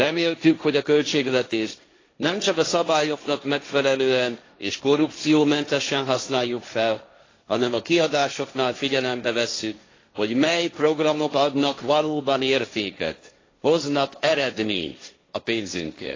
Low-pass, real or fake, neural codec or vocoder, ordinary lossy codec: 7.2 kHz; fake; codec, 16 kHz, 1.1 kbps, Voila-Tokenizer; AAC, 32 kbps